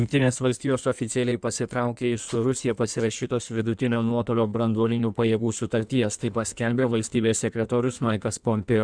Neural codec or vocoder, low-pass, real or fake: codec, 16 kHz in and 24 kHz out, 1.1 kbps, FireRedTTS-2 codec; 9.9 kHz; fake